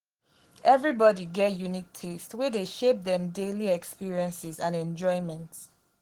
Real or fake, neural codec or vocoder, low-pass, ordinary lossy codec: fake; codec, 44.1 kHz, 7.8 kbps, Pupu-Codec; 19.8 kHz; Opus, 16 kbps